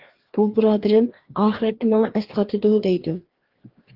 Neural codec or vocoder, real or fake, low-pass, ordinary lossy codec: codec, 16 kHz, 2 kbps, FreqCodec, larger model; fake; 5.4 kHz; Opus, 16 kbps